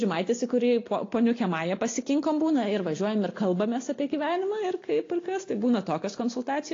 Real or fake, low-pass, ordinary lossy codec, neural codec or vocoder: real; 7.2 kHz; AAC, 32 kbps; none